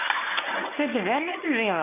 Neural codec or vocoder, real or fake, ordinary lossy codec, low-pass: codec, 24 kHz, 0.9 kbps, WavTokenizer, medium speech release version 1; fake; MP3, 32 kbps; 3.6 kHz